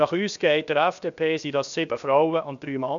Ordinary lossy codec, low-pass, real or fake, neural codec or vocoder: MP3, 96 kbps; 7.2 kHz; fake; codec, 16 kHz, 0.7 kbps, FocalCodec